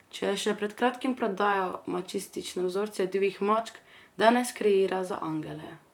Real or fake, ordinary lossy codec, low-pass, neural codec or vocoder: fake; none; 19.8 kHz; vocoder, 44.1 kHz, 128 mel bands, Pupu-Vocoder